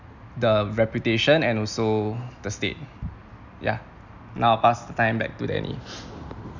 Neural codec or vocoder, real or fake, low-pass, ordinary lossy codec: none; real; 7.2 kHz; none